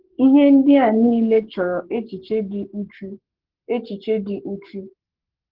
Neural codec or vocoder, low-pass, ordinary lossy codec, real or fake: codec, 44.1 kHz, 7.8 kbps, Pupu-Codec; 5.4 kHz; Opus, 16 kbps; fake